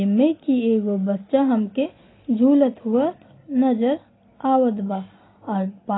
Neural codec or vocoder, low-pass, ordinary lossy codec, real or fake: none; 7.2 kHz; AAC, 16 kbps; real